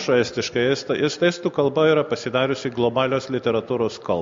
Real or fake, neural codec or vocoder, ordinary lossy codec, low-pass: real; none; MP3, 48 kbps; 7.2 kHz